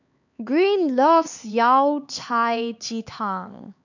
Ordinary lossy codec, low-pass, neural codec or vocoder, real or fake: none; 7.2 kHz; codec, 16 kHz, 4 kbps, X-Codec, HuBERT features, trained on LibriSpeech; fake